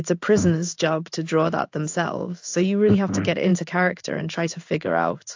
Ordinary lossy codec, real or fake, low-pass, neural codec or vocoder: AAC, 48 kbps; fake; 7.2 kHz; codec, 16 kHz in and 24 kHz out, 1 kbps, XY-Tokenizer